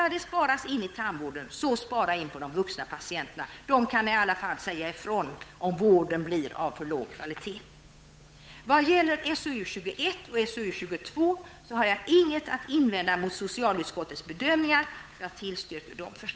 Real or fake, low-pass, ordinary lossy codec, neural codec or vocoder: fake; none; none; codec, 16 kHz, 8 kbps, FunCodec, trained on Chinese and English, 25 frames a second